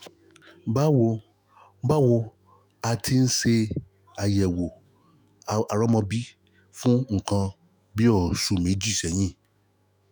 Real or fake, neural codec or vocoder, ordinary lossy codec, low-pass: fake; autoencoder, 48 kHz, 128 numbers a frame, DAC-VAE, trained on Japanese speech; none; none